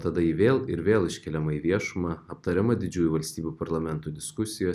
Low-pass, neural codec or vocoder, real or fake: 14.4 kHz; none; real